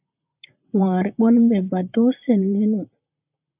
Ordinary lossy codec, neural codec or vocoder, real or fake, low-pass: AAC, 32 kbps; vocoder, 44.1 kHz, 128 mel bands, Pupu-Vocoder; fake; 3.6 kHz